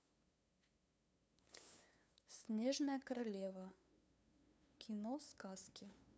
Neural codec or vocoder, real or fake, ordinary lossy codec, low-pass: codec, 16 kHz, 2 kbps, FunCodec, trained on LibriTTS, 25 frames a second; fake; none; none